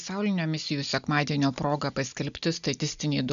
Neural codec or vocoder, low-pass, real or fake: none; 7.2 kHz; real